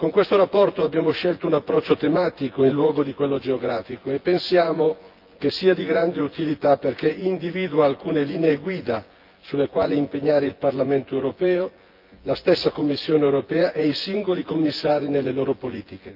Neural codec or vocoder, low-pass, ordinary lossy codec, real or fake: vocoder, 24 kHz, 100 mel bands, Vocos; 5.4 kHz; Opus, 32 kbps; fake